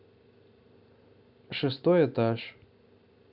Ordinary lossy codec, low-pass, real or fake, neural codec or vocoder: none; 5.4 kHz; real; none